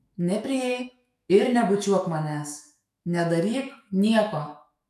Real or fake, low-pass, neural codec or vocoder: fake; 14.4 kHz; autoencoder, 48 kHz, 128 numbers a frame, DAC-VAE, trained on Japanese speech